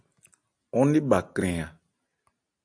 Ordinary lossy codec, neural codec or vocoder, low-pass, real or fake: AAC, 64 kbps; none; 9.9 kHz; real